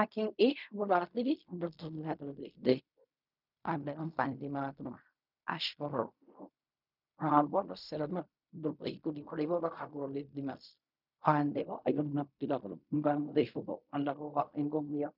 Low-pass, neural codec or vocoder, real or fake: 5.4 kHz; codec, 16 kHz in and 24 kHz out, 0.4 kbps, LongCat-Audio-Codec, fine tuned four codebook decoder; fake